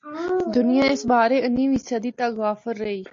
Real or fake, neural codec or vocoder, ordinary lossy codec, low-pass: real; none; AAC, 48 kbps; 7.2 kHz